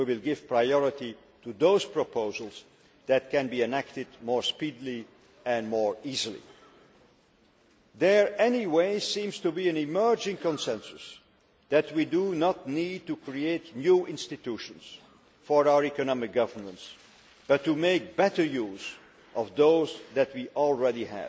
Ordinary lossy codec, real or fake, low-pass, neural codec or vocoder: none; real; none; none